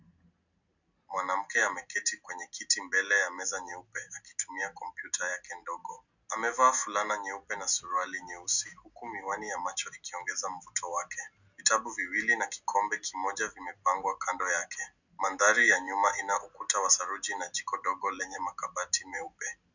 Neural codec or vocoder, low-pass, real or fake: none; 7.2 kHz; real